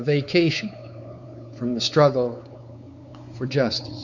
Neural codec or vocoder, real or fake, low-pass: codec, 16 kHz, 4 kbps, X-Codec, WavLM features, trained on Multilingual LibriSpeech; fake; 7.2 kHz